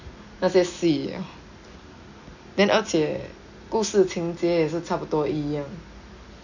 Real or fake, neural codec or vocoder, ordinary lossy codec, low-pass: real; none; none; 7.2 kHz